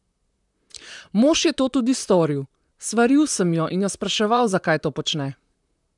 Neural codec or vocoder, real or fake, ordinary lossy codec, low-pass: vocoder, 44.1 kHz, 128 mel bands, Pupu-Vocoder; fake; none; 10.8 kHz